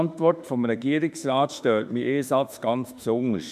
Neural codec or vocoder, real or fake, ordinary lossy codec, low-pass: autoencoder, 48 kHz, 32 numbers a frame, DAC-VAE, trained on Japanese speech; fake; none; 14.4 kHz